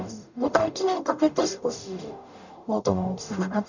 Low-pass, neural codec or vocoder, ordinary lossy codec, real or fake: 7.2 kHz; codec, 44.1 kHz, 0.9 kbps, DAC; none; fake